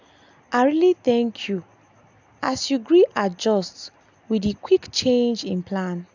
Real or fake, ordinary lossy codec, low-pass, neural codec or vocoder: real; none; 7.2 kHz; none